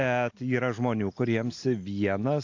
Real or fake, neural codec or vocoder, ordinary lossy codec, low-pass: real; none; AAC, 48 kbps; 7.2 kHz